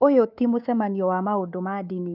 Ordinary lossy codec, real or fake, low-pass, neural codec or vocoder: Opus, 32 kbps; fake; 5.4 kHz; codec, 24 kHz, 3.1 kbps, DualCodec